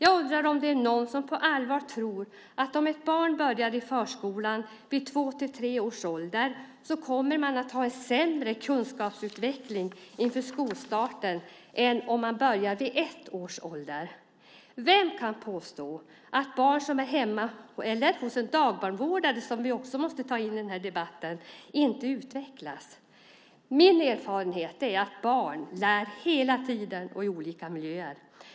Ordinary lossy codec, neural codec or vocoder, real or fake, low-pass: none; none; real; none